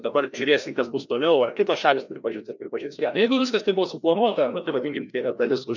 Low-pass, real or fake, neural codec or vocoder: 7.2 kHz; fake; codec, 16 kHz, 1 kbps, FreqCodec, larger model